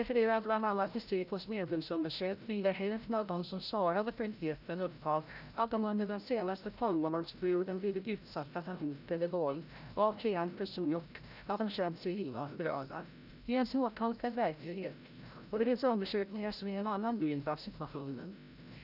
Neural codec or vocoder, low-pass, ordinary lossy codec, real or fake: codec, 16 kHz, 0.5 kbps, FreqCodec, larger model; 5.4 kHz; none; fake